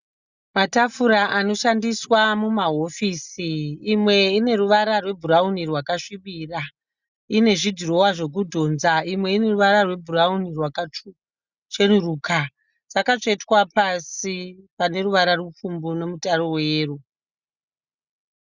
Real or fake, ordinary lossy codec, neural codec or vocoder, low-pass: real; Opus, 64 kbps; none; 7.2 kHz